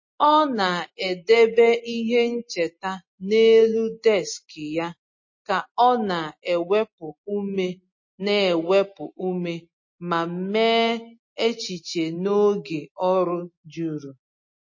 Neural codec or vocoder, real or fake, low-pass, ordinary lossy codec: none; real; 7.2 kHz; MP3, 32 kbps